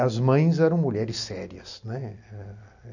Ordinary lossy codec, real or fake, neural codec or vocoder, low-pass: none; real; none; 7.2 kHz